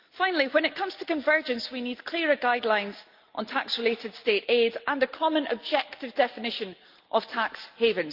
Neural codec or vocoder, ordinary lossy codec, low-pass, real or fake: none; Opus, 32 kbps; 5.4 kHz; real